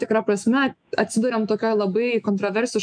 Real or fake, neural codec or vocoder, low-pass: fake; autoencoder, 48 kHz, 128 numbers a frame, DAC-VAE, trained on Japanese speech; 9.9 kHz